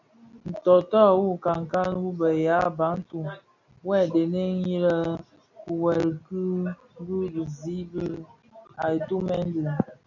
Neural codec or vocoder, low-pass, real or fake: none; 7.2 kHz; real